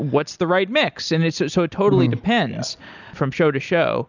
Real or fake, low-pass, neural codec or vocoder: real; 7.2 kHz; none